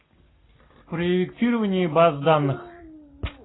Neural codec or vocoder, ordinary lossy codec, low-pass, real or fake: none; AAC, 16 kbps; 7.2 kHz; real